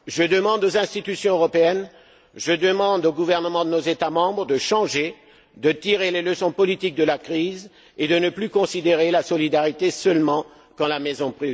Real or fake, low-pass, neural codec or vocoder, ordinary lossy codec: real; none; none; none